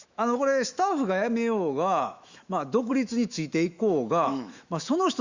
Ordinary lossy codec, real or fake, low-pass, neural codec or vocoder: Opus, 64 kbps; fake; 7.2 kHz; autoencoder, 48 kHz, 128 numbers a frame, DAC-VAE, trained on Japanese speech